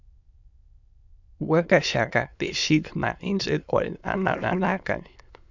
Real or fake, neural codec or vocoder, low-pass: fake; autoencoder, 22.05 kHz, a latent of 192 numbers a frame, VITS, trained on many speakers; 7.2 kHz